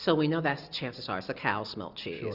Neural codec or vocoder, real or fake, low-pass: none; real; 5.4 kHz